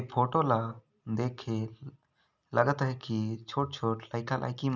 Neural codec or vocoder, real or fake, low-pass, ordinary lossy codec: none; real; 7.2 kHz; none